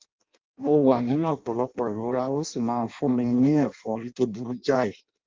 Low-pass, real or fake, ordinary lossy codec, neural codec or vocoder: 7.2 kHz; fake; Opus, 24 kbps; codec, 16 kHz in and 24 kHz out, 0.6 kbps, FireRedTTS-2 codec